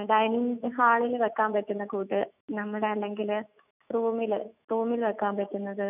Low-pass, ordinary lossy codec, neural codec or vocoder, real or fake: 3.6 kHz; none; autoencoder, 48 kHz, 128 numbers a frame, DAC-VAE, trained on Japanese speech; fake